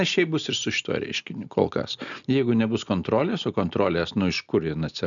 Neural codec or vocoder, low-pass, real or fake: none; 7.2 kHz; real